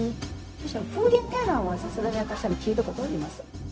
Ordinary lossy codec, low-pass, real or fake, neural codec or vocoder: none; none; fake; codec, 16 kHz, 0.4 kbps, LongCat-Audio-Codec